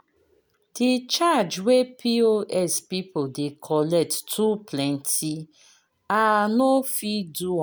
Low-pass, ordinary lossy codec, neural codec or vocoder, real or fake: none; none; none; real